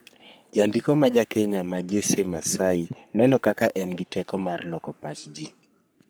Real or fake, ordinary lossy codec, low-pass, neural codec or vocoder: fake; none; none; codec, 44.1 kHz, 3.4 kbps, Pupu-Codec